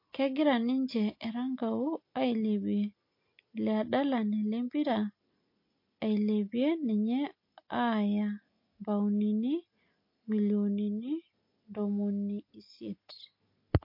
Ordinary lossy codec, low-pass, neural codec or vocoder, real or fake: MP3, 32 kbps; 5.4 kHz; none; real